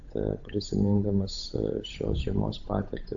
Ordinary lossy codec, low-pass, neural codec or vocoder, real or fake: AAC, 64 kbps; 7.2 kHz; codec, 16 kHz, 8 kbps, FunCodec, trained on Chinese and English, 25 frames a second; fake